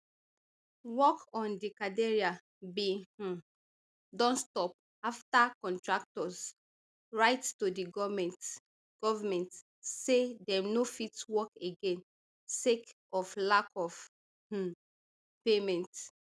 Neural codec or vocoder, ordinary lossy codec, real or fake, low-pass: none; none; real; none